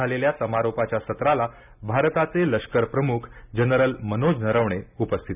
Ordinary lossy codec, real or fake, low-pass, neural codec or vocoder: none; real; 3.6 kHz; none